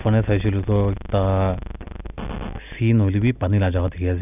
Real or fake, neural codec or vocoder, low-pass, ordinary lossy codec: real; none; 3.6 kHz; none